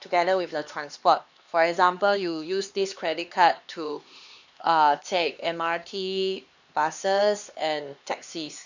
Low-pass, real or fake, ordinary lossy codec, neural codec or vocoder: 7.2 kHz; fake; none; codec, 16 kHz, 2 kbps, X-Codec, HuBERT features, trained on LibriSpeech